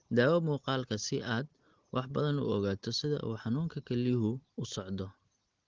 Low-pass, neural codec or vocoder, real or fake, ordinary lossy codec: 7.2 kHz; none; real; Opus, 16 kbps